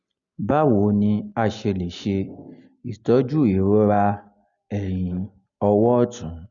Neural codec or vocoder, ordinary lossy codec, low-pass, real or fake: none; Opus, 64 kbps; 7.2 kHz; real